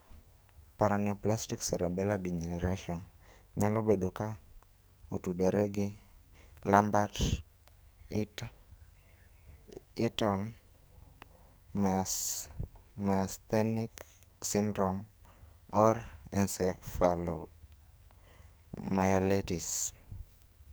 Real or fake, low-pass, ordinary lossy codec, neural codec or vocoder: fake; none; none; codec, 44.1 kHz, 2.6 kbps, SNAC